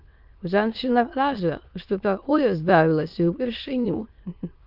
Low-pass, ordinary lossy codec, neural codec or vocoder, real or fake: 5.4 kHz; Opus, 32 kbps; autoencoder, 22.05 kHz, a latent of 192 numbers a frame, VITS, trained on many speakers; fake